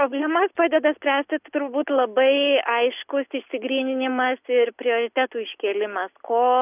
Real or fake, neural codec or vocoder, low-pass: real; none; 3.6 kHz